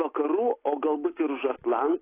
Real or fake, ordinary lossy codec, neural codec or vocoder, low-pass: real; AAC, 16 kbps; none; 3.6 kHz